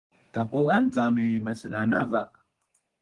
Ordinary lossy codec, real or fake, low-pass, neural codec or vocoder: Opus, 24 kbps; fake; 10.8 kHz; codec, 24 kHz, 1 kbps, SNAC